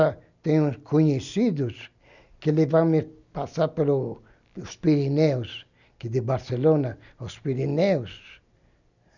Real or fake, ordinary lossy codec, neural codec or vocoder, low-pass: real; none; none; 7.2 kHz